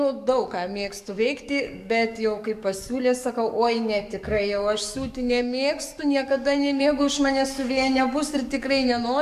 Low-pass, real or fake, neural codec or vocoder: 14.4 kHz; fake; codec, 44.1 kHz, 7.8 kbps, DAC